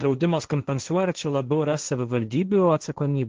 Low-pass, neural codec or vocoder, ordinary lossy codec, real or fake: 7.2 kHz; codec, 16 kHz, 1.1 kbps, Voila-Tokenizer; Opus, 16 kbps; fake